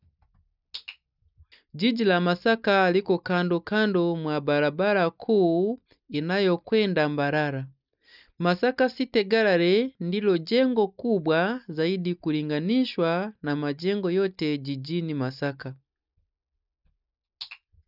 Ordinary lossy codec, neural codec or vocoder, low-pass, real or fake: none; none; 5.4 kHz; real